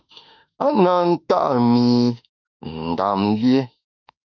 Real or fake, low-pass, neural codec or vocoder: fake; 7.2 kHz; autoencoder, 48 kHz, 32 numbers a frame, DAC-VAE, trained on Japanese speech